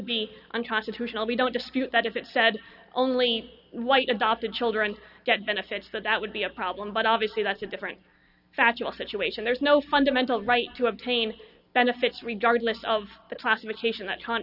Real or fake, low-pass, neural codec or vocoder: real; 5.4 kHz; none